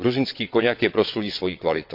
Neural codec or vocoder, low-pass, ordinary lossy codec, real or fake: vocoder, 22.05 kHz, 80 mel bands, WaveNeXt; 5.4 kHz; MP3, 48 kbps; fake